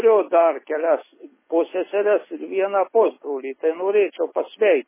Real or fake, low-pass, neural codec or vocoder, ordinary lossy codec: real; 3.6 kHz; none; MP3, 16 kbps